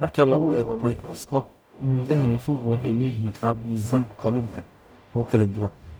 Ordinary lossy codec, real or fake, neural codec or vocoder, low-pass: none; fake; codec, 44.1 kHz, 0.9 kbps, DAC; none